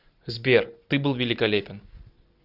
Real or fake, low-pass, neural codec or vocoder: real; 5.4 kHz; none